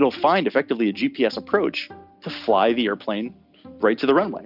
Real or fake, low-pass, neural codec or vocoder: real; 5.4 kHz; none